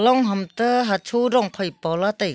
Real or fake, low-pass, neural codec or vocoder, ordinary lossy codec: real; none; none; none